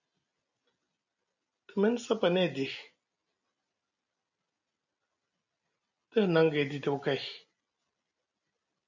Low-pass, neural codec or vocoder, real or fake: 7.2 kHz; none; real